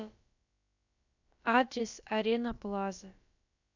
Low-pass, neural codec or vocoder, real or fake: 7.2 kHz; codec, 16 kHz, about 1 kbps, DyCAST, with the encoder's durations; fake